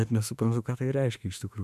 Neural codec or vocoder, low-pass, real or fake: autoencoder, 48 kHz, 32 numbers a frame, DAC-VAE, trained on Japanese speech; 14.4 kHz; fake